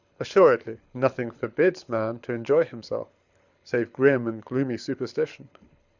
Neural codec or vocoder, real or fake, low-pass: codec, 24 kHz, 6 kbps, HILCodec; fake; 7.2 kHz